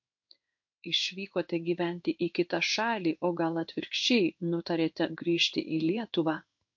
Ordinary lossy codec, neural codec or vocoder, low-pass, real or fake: MP3, 48 kbps; codec, 16 kHz in and 24 kHz out, 1 kbps, XY-Tokenizer; 7.2 kHz; fake